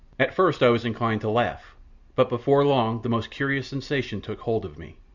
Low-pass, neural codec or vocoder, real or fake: 7.2 kHz; none; real